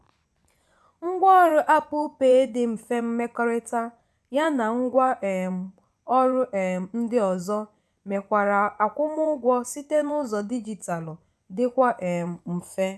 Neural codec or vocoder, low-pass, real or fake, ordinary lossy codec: vocoder, 24 kHz, 100 mel bands, Vocos; none; fake; none